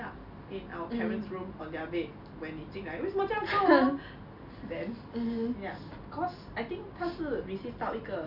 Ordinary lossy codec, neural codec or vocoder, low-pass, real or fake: none; none; 5.4 kHz; real